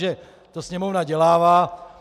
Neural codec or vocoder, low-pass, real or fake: none; 14.4 kHz; real